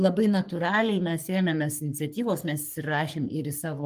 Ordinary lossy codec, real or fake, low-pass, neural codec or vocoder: Opus, 32 kbps; fake; 14.4 kHz; codec, 44.1 kHz, 3.4 kbps, Pupu-Codec